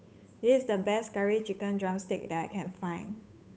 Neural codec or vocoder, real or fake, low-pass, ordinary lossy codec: codec, 16 kHz, 8 kbps, FunCodec, trained on Chinese and English, 25 frames a second; fake; none; none